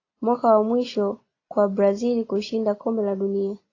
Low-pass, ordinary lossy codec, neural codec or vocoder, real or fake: 7.2 kHz; AAC, 32 kbps; none; real